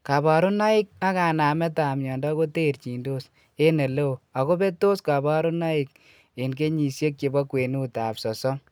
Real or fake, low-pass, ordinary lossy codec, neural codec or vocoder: real; none; none; none